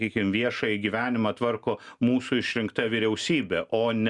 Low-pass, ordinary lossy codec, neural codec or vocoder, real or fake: 10.8 kHz; Opus, 64 kbps; none; real